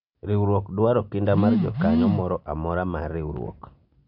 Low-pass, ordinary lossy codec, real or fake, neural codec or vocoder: 5.4 kHz; none; real; none